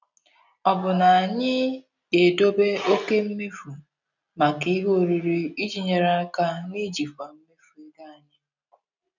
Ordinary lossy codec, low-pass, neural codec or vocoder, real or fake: none; 7.2 kHz; none; real